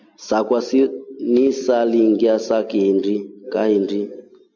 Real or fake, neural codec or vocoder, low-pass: real; none; 7.2 kHz